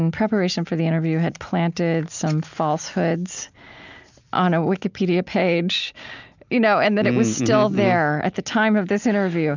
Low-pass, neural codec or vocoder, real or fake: 7.2 kHz; none; real